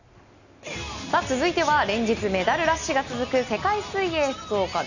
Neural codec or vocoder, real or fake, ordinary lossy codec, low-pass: none; real; none; 7.2 kHz